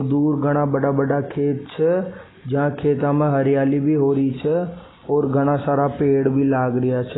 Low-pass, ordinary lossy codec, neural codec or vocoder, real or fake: 7.2 kHz; AAC, 16 kbps; none; real